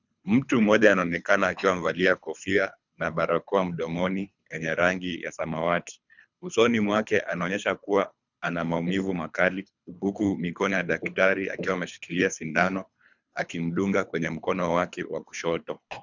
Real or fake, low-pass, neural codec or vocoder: fake; 7.2 kHz; codec, 24 kHz, 3 kbps, HILCodec